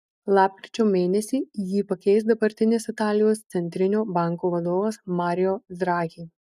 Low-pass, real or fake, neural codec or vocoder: 14.4 kHz; real; none